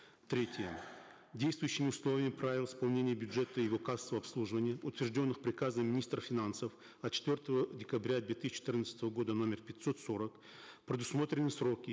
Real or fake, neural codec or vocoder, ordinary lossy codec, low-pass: real; none; none; none